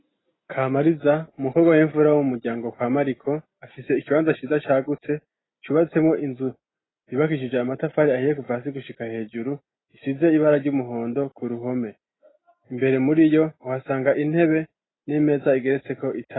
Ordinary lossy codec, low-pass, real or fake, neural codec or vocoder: AAC, 16 kbps; 7.2 kHz; real; none